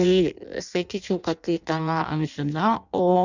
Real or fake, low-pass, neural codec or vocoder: fake; 7.2 kHz; codec, 16 kHz in and 24 kHz out, 0.6 kbps, FireRedTTS-2 codec